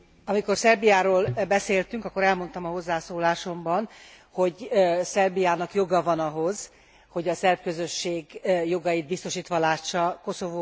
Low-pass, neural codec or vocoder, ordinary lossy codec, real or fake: none; none; none; real